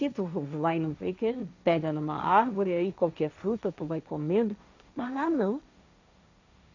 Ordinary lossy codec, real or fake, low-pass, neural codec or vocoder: none; fake; 7.2 kHz; codec, 16 kHz, 1.1 kbps, Voila-Tokenizer